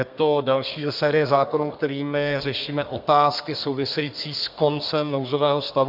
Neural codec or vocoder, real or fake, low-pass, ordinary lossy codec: codec, 32 kHz, 1.9 kbps, SNAC; fake; 5.4 kHz; MP3, 48 kbps